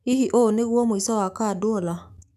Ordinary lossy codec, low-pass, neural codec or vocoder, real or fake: none; 14.4 kHz; autoencoder, 48 kHz, 128 numbers a frame, DAC-VAE, trained on Japanese speech; fake